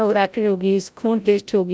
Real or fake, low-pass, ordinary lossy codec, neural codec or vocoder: fake; none; none; codec, 16 kHz, 0.5 kbps, FreqCodec, larger model